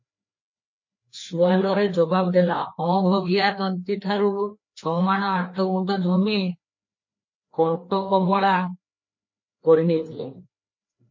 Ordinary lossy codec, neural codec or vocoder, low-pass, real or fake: MP3, 32 kbps; codec, 16 kHz, 2 kbps, FreqCodec, larger model; 7.2 kHz; fake